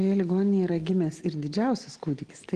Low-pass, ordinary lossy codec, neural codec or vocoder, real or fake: 9.9 kHz; Opus, 16 kbps; none; real